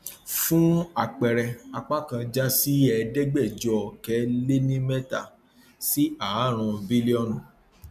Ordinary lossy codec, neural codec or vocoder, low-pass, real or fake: MP3, 96 kbps; none; 14.4 kHz; real